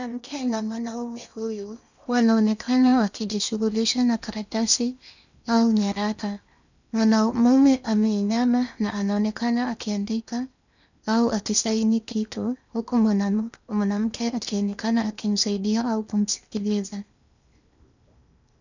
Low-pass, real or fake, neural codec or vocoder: 7.2 kHz; fake; codec, 16 kHz in and 24 kHz out, 0.8 kbps, FocalCodec, streaming, 65536 codes